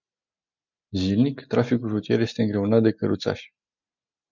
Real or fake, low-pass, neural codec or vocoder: real; 7.2 kHz; none